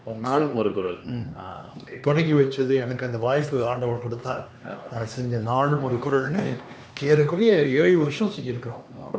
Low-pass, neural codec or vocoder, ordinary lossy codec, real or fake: none; codec, 16 kHz, 2 kbps, X-Codec, HuBERT features, trained on LibriSpeech; none; fake